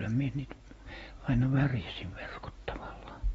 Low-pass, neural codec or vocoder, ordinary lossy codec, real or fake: 19.8 kHz; none; AAC, 24 kbps; real